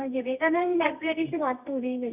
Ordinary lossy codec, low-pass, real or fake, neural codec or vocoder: none; 3.6 kHz; fake; codec, 24 kHz, 0.9 kbps, WavTokenizer, medium music audio release